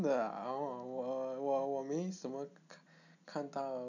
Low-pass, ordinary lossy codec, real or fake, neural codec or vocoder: 7.2 kHz; none; real; none